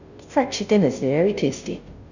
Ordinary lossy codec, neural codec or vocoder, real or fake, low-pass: none; codec, 16 kHz, 0.5 kbps, FunCodec, trained on Chinese and English, 25 frames a second; fake; 7.2 kHz